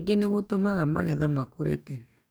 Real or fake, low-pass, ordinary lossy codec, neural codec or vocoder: fake; none; none; codec, 44.1 kHz, 2.6 kbps, DAC